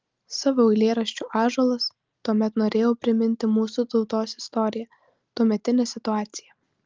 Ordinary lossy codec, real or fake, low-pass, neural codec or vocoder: Opus, 32 kbps; real; 7.2 kHz; none